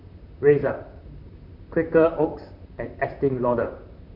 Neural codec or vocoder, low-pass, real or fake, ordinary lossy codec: vocoder, 44.1 kHz, 128 mel bands, Pupu-Vocoder; 5.4 kHz; fake; none